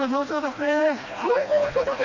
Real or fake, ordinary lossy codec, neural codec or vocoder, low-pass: fake; none; codec, 16 kHz, 1 kbps, FreqCodec, smaller model; 7.2 kHz